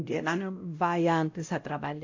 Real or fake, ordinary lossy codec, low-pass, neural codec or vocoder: fake; AAC, 48 kbps; 7.2 kHz; codec, 16 kHz, 0.5 kbps, X-Codec, WavLM features, trained on Multilingual LibriSpeech